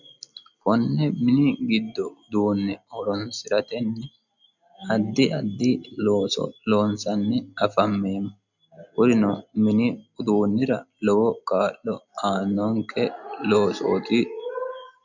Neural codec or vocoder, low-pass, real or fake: none; 7.2 kHz; real